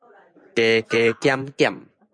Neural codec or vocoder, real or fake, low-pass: vocoder, 24 kHz, 100 mel bands, Vocos; fake; 9.9 kHz